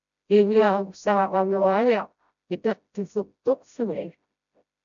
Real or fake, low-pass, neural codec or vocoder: fake; 7.2 kHz; codec, 16 kHz, 0.5 kbps, FreqCodec, smaller model